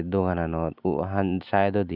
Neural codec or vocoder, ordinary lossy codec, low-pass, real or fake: none; none; 5.4 kHz; real